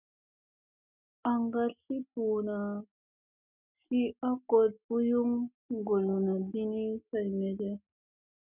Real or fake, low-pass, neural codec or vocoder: real; 3.6 kHz; none